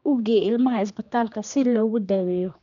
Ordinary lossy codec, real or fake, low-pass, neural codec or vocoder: none; fake; 7.2 kHz; codec, 16 kHz, 2 kbps, X-Codec, HuBERT features, trained on general audio